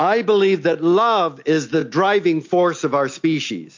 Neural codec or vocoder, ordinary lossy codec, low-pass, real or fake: none; MP3, 48 kbps; 7.2 kHz; real